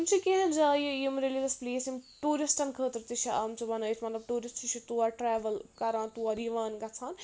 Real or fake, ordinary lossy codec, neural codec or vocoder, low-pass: real; none; none; none